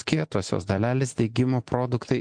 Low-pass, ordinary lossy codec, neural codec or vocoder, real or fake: 9.9 kHz; MP3, 64 kbps; none; real